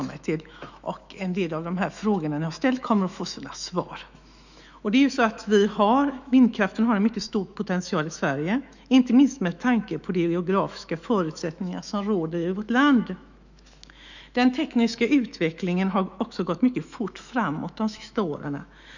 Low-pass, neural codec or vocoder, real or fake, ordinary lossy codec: 7.2 kHz; autoencoder, 48 kHz, 128 numbers a frame, DAC-VAE, trained on Japanese speech; fake; none